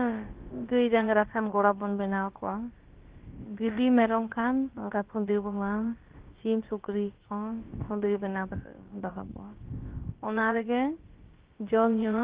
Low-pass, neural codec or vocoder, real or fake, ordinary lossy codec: 3.6 kHz; codec, 16 kHz, about 1 kbps, DyCAST, with the encoder's durations; fake; Opus, 16 kbps